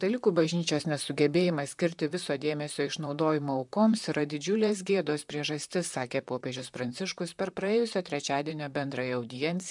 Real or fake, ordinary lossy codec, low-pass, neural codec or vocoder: fake; MP3, 96 kbps; 10.8 kHz; vocoder, 44.1 kHz, 128 mel bands, Pupu-Vocoder